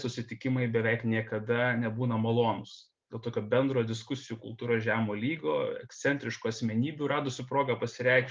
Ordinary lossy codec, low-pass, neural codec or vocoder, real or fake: Opus, 24 kbps; 7.2 kHz; none; real